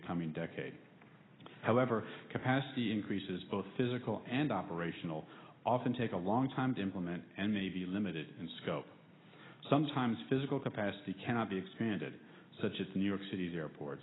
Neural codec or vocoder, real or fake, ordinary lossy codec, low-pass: none; real; AAC, 16 kbps; 7.2 kHz